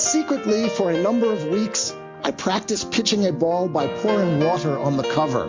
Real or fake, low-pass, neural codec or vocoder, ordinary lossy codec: real; 7.2 kHz; none; MP3, 48 kbps